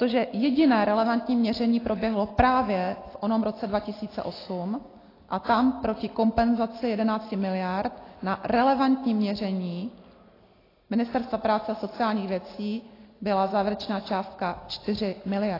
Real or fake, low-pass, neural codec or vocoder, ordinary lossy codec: real; 5.4 kHz; none; AAC, 24 kbps